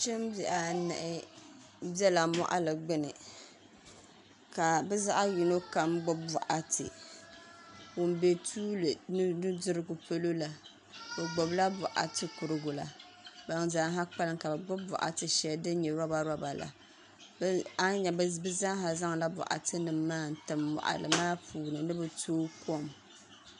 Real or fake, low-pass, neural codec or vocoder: real; 10.8 kHz; none